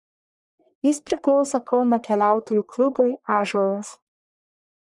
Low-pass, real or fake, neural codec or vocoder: 10.8 kHz; fake; codec, 44.1 kHz, 1.7 kbps, Pupu-Codec